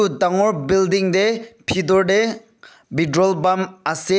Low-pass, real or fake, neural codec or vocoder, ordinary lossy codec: none; real; none; none